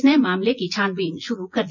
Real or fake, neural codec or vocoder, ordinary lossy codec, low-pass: fake; vocoder, 24 kHz, 100 mel bands, Vocos; none; 7.2 kHz